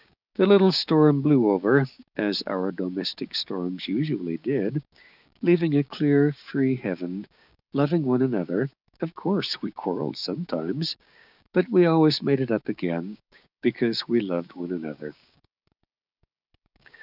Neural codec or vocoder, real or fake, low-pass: codec, 16 kHz, 6 kbps, DAC; fake; 5.4 kHz